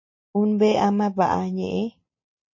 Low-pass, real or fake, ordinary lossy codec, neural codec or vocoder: 7.2 kHz; real; MP3, 32 kbps; none